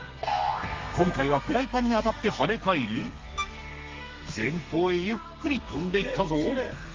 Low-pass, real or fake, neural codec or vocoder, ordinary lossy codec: 7.2 kHz; fake; codec, 32 kHz, 1.9 kbps, SNAC; Opus, 32 kbps